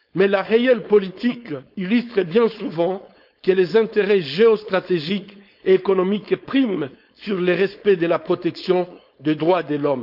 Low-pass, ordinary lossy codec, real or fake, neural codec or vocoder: 5.4 kHz; none; fake; codec, 16 kHz, 4.8 kbps, FACodec